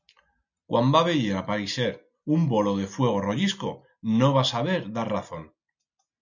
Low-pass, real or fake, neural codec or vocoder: 7.2 kHz; real; none